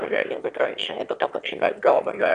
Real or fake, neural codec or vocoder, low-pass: fake; autoencoder, 22.05 kHz, a latent of 192 numbers a frame, VITS, trained on one speaker; 9.9 kHz